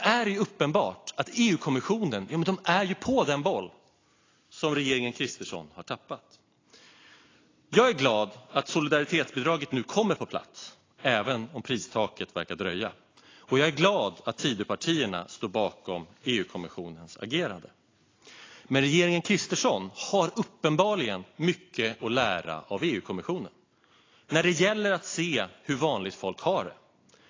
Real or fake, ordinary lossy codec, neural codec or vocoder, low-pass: real; AAC, 32 kbps; none; 7.2 kHz